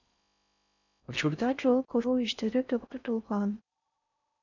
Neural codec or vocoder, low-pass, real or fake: codec, 16 kHz in and 24 kHz out, 0.6 kbps, FocalCodec, streaming, 4096 codes; 7.2 kHz; fake